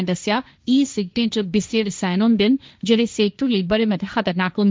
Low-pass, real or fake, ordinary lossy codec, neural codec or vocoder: none; fake; none; codec, 16 kHz, 1.1 kbps, Voila-Tokenizer